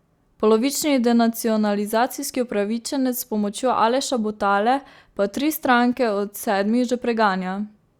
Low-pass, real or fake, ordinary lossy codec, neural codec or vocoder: 19.8 kHz; real; Opus, 64 kbps; none